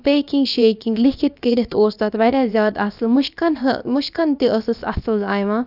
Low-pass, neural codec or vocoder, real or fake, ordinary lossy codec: 5.4 kHz; codec, 16 kHz, about 1 kbps, DyCAST, with the encoder's durations; fake; none